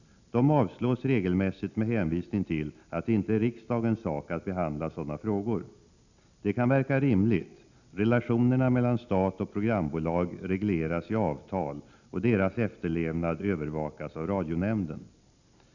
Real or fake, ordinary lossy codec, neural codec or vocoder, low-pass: real; none; none; 7.2 kHz